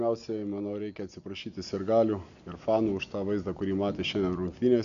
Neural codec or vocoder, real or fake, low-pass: none; real; 7.2 kHz